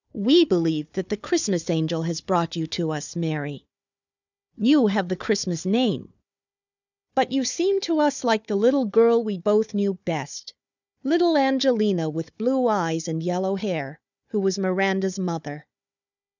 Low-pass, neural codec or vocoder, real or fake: 7.2 kHz; codec, 16 kHz, 4 kbps, FunCodec, trained on Chinese and English, 50 frames a second; fake